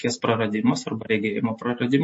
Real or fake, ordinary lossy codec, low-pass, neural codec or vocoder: real; MP3, 32 kbps; 10.8 kHz; none